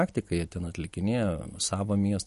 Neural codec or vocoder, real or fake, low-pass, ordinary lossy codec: none; real; 14.4 kHz; MP3, 48 kbps